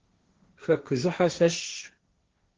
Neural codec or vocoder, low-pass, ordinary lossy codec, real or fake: codec, 16 kHz, 1.1 kbps, Voila-Tokenizer; 7.2 kHz; Opus, 16 kbps; fake